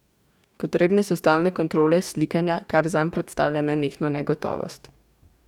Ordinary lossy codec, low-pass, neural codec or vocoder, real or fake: none; 19.8 kHz; codec, 44.1 kHz, 2.6 kbps, DAC; fake